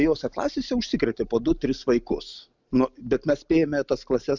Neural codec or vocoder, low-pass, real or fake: none; 7.2 kHz; real